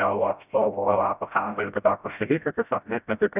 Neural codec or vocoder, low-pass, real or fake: codec, 16 kHz, 0.5 kbps, FreqCodec, smaller model; 3.6 kHz; fake